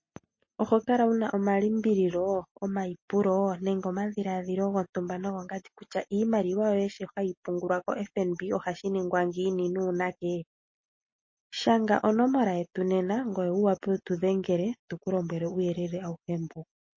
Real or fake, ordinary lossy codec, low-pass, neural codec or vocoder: real; MP3, 32 kbps; 7.2 kHz; none